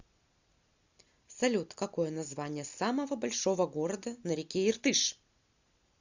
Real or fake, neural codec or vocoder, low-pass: real; none; 7.2 kHz